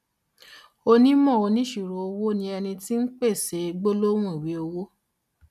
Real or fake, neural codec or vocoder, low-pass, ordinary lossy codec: real; none; 14.4 kHz; none